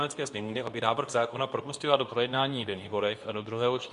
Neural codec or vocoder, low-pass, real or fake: codec, 24 kHz, 0.9 kbps, WavTokenizer, medium speech release version 2; 10.8 kHz; fake